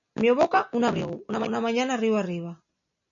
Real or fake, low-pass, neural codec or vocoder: real; 7.2 kHz; none